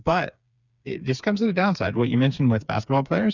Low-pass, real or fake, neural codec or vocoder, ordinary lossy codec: 7.2 kHz; fake; codec, 16 kHz, 4 kbps, FreqCodec, smaller model; Opus, 64 kbps